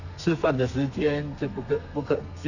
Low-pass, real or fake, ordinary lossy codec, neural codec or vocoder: 7.2 kHz; fake; none; codec, 32 kHz, 1.9 kbps, SNAC